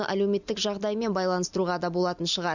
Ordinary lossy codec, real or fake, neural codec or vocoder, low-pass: none; real; none; 7.2 kHz